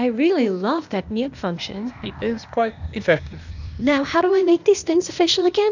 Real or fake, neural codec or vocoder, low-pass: fake; codec, 16 kHz, 0.8 kbps, ZipCodec; 7.2 kHz